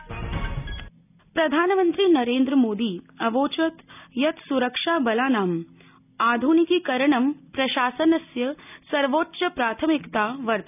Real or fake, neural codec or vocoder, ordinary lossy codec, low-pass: real; none; none; 3.6 kHz